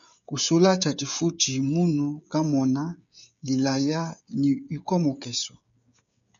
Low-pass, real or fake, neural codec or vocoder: 7.2 kHz; fake; codec, 16 kHz, 16 kbps, FreqCodec, smaller model